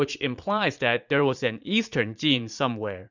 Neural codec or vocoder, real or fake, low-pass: vocoder, 44.1 kHz, 80 mel bands, Vocos; fake; 7.2 kHz